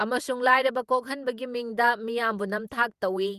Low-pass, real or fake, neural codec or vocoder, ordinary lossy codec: 14.4 kHz; fake; vocoder, 48 kHz, 128 mel bands, Vocos; Opus, 32 kbps